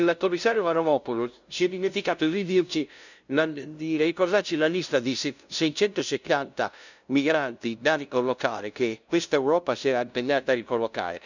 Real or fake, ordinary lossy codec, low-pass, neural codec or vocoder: fake; none; 7.2 kHz; codec, 16 kHz, 0.5 kbps, FunCodec, trained on LibriTTS, 25 frames a second